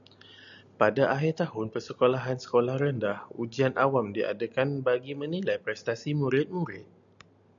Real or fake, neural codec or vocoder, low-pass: real; none; 7.2 kHz